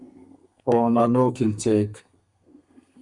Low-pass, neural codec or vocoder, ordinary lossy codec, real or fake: 10.8 kHz; codec, 32 kHz, 1.9 kbps, SNAC; MP3, 96 kbps; fake